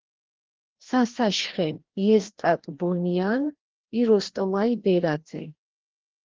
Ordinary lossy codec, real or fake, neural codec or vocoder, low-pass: Opus, 16 kbps; fake; codec, 16 kHz, 1 kbps, FreqCodec, larger model; 7.2 kHz